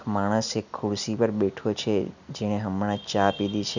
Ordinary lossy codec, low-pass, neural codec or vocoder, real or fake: none; 7.2 kHz; none; real